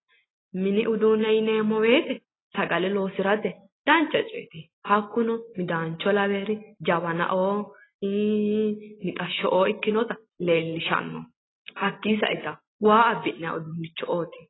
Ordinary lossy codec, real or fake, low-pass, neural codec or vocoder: AAC, 16 kbps; real; 7.2 kHz; none